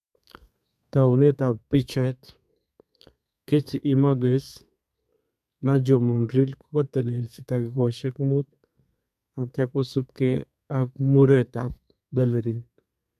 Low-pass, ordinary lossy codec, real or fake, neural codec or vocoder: 14.4 kHz; MP3, 96 kbps; fake; codec, 32 kHz, 1.9 kbps, SNAC